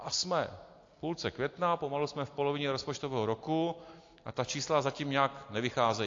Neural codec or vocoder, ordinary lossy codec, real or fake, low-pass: none; AAC, 48 kbps; real; 7.2 kHz